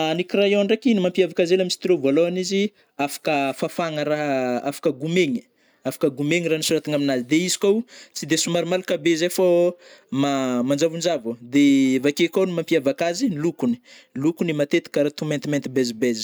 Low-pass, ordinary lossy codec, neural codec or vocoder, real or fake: none; none; none; real